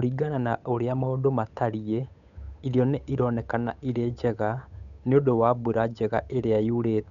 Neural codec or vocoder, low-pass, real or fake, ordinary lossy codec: codec, 16 kHz, 8 kbps, FunCodec, trained on Chinese and English, 25 frames a second; 7.2 kHz; fake; none